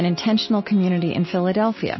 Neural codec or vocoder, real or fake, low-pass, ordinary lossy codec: none; real; 7.2 kHz; MP3, 24 kbps